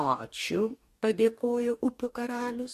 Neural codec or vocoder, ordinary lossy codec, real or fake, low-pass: codec, 44.1 kHz, 2.6 kbps, DAC; MP3, 64 kbps; fake; 14.4 kHz